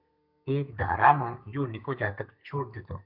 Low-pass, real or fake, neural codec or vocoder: 5.4 kHz; fake; codec, 32 kHz, 1.9 kbps, SNAC